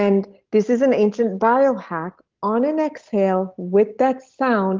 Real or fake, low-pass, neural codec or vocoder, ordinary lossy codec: real; 7.2 kHz; none; Opus, 16 kbps